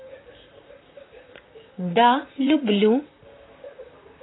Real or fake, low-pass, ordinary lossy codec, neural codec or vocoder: fake; 7.2 kHz; AAC, 16 kbps; vocoder, 44.1 kHz, 128 mel bands, Pupu-Vocoder